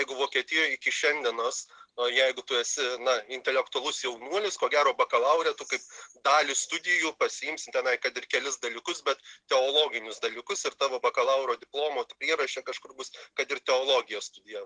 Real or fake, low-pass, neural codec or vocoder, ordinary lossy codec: real; 9.9 kHz; none; Opus, 16 kbps